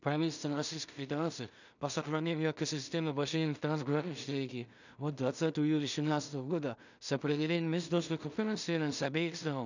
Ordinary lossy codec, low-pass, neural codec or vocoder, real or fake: none; 7.2 kHz; codec, 16 kHz in and 24 kHz out, 0.4 kbps, LongCat-Audio-Codec, two codebook decoder; fake